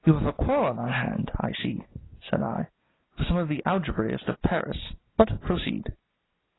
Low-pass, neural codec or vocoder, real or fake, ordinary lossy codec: 7.2 kHz; none; real; AAC, 16 kbps